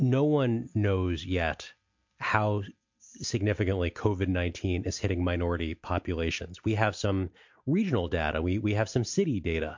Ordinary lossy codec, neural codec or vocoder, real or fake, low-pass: MP3, 48 kbps; none; real; 7.2 kHz